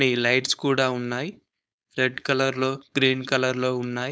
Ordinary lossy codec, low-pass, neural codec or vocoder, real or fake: none; none; codec, 16 kHz, 4.8 kbps, FACodec; fake